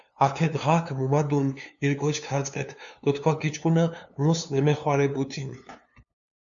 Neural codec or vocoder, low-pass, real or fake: codec, 16 kHz, 2 kbps, FunCodec, trained on LibriTTS, 25 frames a second; 7.2 kHz; fake